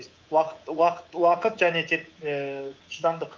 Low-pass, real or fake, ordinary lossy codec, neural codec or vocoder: 7.2 kHz; fake; Opus, 24 kbps; codec, 24 kHz, 3.1 kbps, DualCodec